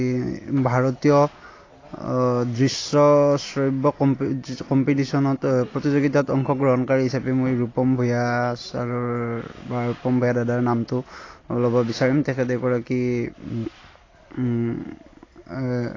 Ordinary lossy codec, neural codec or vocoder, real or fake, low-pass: AAC, 32 kbps; none; real; 7.2 kHz